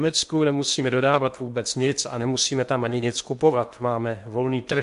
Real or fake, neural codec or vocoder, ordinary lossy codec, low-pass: fake; codec, 16 kHz in and 24 kHz out, 0.8 kbps, FocalCodec, streaming, 65536 codes; MP3, 64 kbps; 10.8 kHz